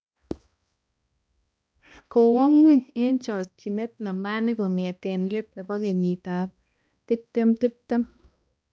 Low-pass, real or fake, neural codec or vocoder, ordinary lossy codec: none; fake; codec, 16 kHz, 1 kbps, X-Codec, HuBERT features, trained on balanced general audio; none